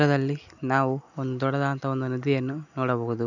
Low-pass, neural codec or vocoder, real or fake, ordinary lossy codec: 7.2 kHz; none; real; none